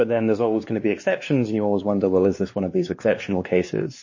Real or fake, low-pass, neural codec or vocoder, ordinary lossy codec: fake; 7.2 kHz; codec, 16 kHz, 2 kbps, X-Codec, WavLM features, trained on Multilingual LibriSpeech; MP3, 32 kbps